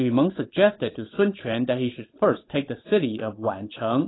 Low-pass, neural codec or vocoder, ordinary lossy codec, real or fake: 7.2 kHz; codec, 16 kHz, 8 kbps, FunCodec, trained on Chinese and English, 25 frames a second; AAC, 16 kbps; fake